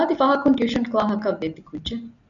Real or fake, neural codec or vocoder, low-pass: real; none; 7.2 kHz